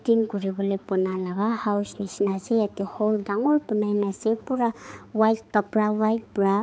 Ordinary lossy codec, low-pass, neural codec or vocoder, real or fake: none; none; codec, 16 kHz, 4 kbps, X-Codec, HuBERT features, trained on balanced general audio; fake